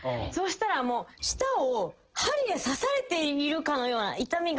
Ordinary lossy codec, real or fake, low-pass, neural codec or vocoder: Opus, 16 kbps; real; 7.2 kHz; none